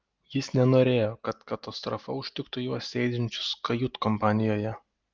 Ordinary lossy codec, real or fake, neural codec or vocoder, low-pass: Opus, 32 kbps; real; none; 7.2 kHz